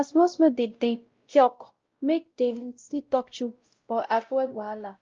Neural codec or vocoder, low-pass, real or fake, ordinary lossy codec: codec, 16 kHz, 0.5 kbps, X-Codec, WavLM features, trained on Multilingual LibriSpeech; 7.2 kHz; fake; Opus, 24 kbps